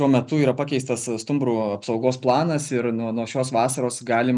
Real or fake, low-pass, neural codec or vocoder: real; 10.8 kHz; none